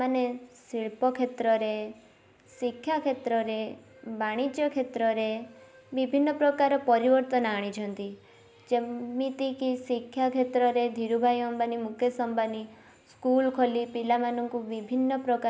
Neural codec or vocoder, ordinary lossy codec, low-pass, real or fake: none; none; none; real